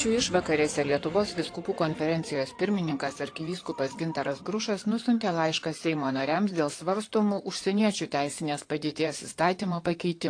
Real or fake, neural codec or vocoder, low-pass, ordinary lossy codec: fake; codec, 16 kHz in and 24 kHz out, 2.2 kbps, FireRedTTS-2 codec; 9.9 kHz; AAC, 48 kbps